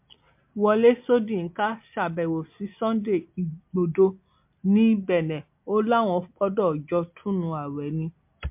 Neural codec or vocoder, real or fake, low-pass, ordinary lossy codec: none; real; 3.6 kHz; MP3, 32 kbps